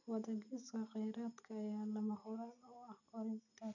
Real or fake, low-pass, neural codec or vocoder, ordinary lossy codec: real; 7.2 kHz; none; none